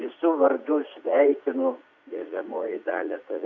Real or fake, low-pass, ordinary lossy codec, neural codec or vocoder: fake; 7.2 kHz; Opus, 64 kbps; vocoder, 44.1 kHz, 80 mel bands, Vocos